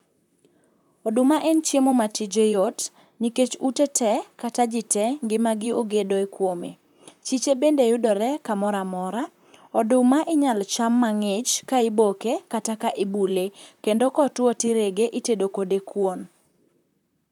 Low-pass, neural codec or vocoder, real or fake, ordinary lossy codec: 19.8 kHz; vocoder, 44.1 kHz, 128 mel bands, Pupu-Vocoder; fake; none